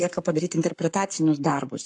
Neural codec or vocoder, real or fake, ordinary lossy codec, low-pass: codec, 44.1 kHz, 7.8 kbps, Pupu-Codec; fake; AAC, 64 kbps; 10.8 kHz